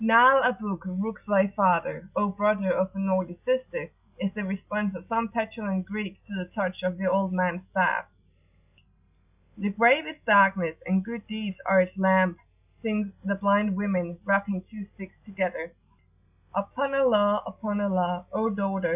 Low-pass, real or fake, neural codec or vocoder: 3.6 kHz; real; none